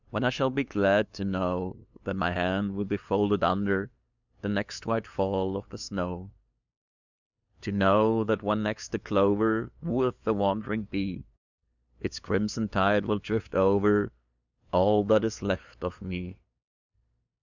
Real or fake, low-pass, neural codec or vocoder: fake; 7.2 kHz; codec, 16 kHz, 2 kbps, FunCodec, trained on LibriTTS, 25 frames a second